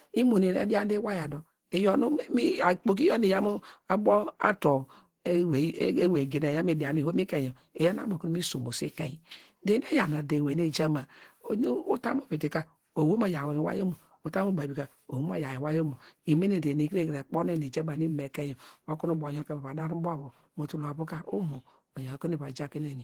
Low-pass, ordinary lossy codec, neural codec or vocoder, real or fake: 19.8 kHz; Opus, 16 kbps; vocoder, 48 kHz, 128 mel bands, Vocos; fake